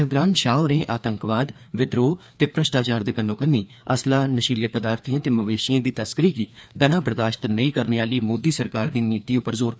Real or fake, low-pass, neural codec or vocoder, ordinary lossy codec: fake; none; codec, 16 kHz, 2 kbps, FreqCodec, larger model; none